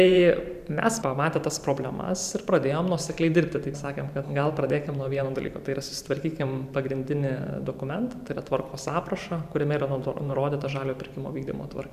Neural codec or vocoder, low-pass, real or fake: vocoder, 44.1 kHz, 128 mel bands every 512 samples, BigVGAN v2; 14.4 kHz; fake